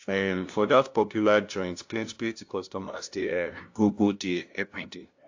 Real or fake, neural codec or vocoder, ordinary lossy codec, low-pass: fake; codec, 16 kHz, 0.5 kbps, FunCodec, trained on LibriTTS, 25 frames a second; AAC, 48 kbps; 7.2 kHz